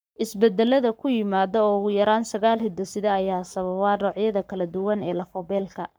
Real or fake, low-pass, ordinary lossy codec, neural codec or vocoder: fake; none; none; codec, 44.1 kHz, 7.8 kbps, Pupu-Codec